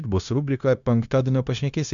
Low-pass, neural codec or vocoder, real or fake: 7.2 kHz; codec, 16 kHz, 0.9 kbps, LongCat-Audio-Codec; fake